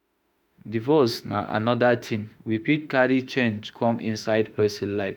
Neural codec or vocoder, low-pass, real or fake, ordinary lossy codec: autoencoder, 48 kHz, 32 numbers a frame, DAC-VAE, trained on Japanese speech; none; fake; none